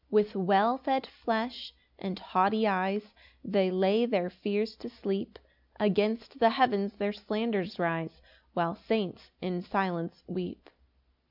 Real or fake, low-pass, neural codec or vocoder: real; 5.4 kHz; none